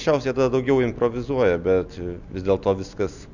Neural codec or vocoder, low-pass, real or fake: none; 7.2 kHz; real